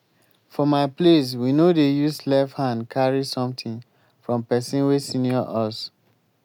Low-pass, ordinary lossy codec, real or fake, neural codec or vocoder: 19.8 kHz; none; real; none